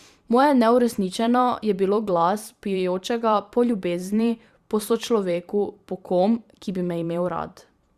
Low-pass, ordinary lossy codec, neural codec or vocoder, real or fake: 14.4 kHz; Opus, 64 kbps; vocoder, 44.1 kHz, 128 mel bands every 512 samples, BigVGAN v2; fake